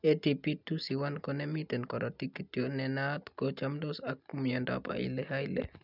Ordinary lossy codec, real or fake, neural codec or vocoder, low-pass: none; real; none; 5.4 kHz